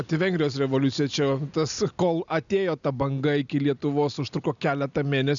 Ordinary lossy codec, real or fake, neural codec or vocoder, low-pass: MP3, 96 kbps; real; none; 7.2 kHz